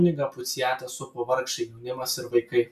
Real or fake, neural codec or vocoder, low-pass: real; none; 14.4 kHz